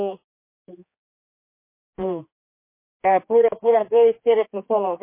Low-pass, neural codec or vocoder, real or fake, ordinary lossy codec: 3.6 kHz; codec, 16 kHz, 4 kbps, X-Codec, HuBERT features, trained on general audio; fake; MP3, 24 kbps